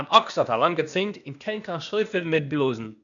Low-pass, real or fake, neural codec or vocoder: 7.2 kHz; fake; codec, 16 kHz, 0.8 kbps, ZipCodec